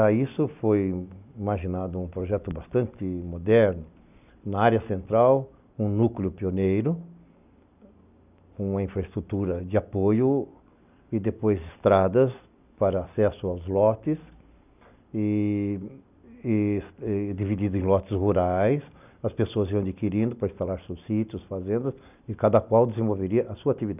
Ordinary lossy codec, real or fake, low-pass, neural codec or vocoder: none; real; 3.6 kHz; none